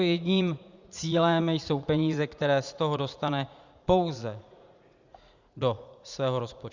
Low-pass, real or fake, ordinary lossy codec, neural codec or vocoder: 7.2 kHz; fake; Opus, 64 kbps; vocoder, 44.1 kHz, 80 mel bands, Vocos